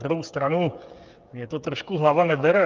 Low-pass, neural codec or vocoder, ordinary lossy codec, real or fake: 7.2 kHz; codec, 16 kHz, 8 kbps, FreqCodec, smaller model; Opus, 24 kbps; fake